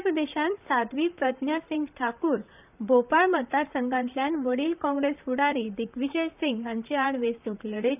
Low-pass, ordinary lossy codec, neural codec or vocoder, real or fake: 3.6 kHz; none; vocoder, 44.1 kHz, 128 mel bands, Pupu-Vocoder; fake